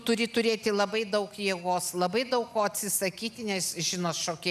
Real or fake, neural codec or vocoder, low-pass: real; none; 14.4 kHz